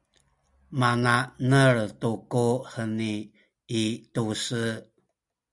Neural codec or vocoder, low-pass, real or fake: none; 10.8 kHz; real